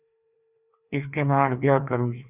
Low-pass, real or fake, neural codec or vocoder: 3.6 kHz; fake; codec, 16 kHz, 2 kbps, FreqCodec, larger model